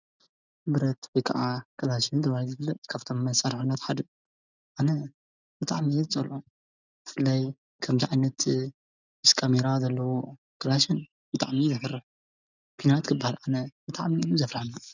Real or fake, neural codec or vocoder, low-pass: real; none; 7.2 kHz